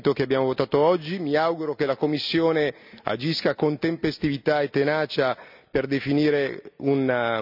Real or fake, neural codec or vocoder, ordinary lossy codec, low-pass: real; none; none; 5.4 kHz